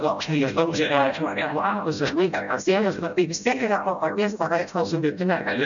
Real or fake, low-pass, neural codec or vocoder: fake; 7.2 kHz; codec, 16 kHz, 0.5 kbps, FreqCodec, smaller model